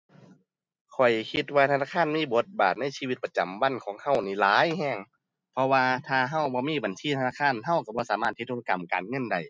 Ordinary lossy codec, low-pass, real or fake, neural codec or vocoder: none; none; real; none